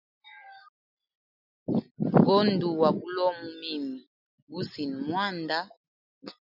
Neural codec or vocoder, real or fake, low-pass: none; real; 5.4 kHz